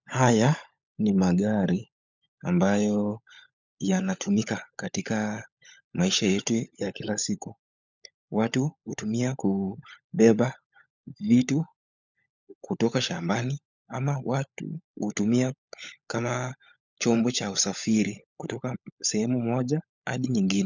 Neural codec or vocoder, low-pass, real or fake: codec, 16 kHz, 16 kbps, FunCodec, trained on LibriTTS, 50 frames a second; 7.2 kHz; fake